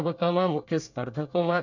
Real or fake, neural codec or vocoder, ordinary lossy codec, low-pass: fake; codec, 24 kHz, 1 kbps, SNAC; none; 7.2 kHz